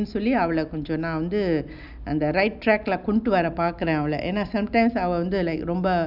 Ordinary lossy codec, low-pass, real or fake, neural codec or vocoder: none; 5.4 kHz; real; none